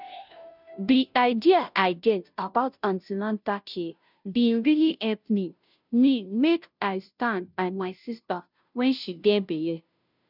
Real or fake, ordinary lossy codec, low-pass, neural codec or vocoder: fake; none; 5.4 kHz; codec, 16 kHz, 0.5 kbps, FunCodec, trained on Chinese and English, 25 frames a second